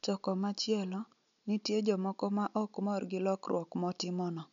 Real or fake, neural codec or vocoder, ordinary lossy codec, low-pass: real; none; none; 7.2 kHz